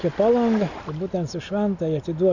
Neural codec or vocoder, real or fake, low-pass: none; real; 7.2 kHz